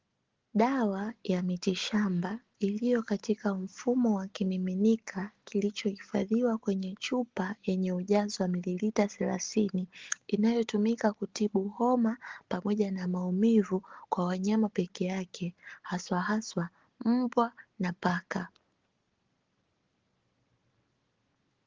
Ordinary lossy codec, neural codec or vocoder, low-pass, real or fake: Opus, 16 kbps; none; 7.2 kHz; real